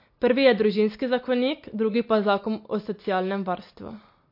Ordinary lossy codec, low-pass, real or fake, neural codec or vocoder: MP3, 32 kbps; 5.4 kHz; real; none